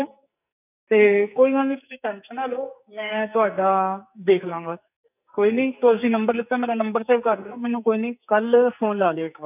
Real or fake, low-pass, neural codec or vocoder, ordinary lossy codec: fake; 3.6 kHz; codec, 44.1 kHz, 2.6 kbps, SNAC; none